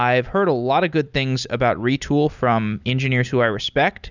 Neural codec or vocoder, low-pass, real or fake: none; 7.2 kHz; real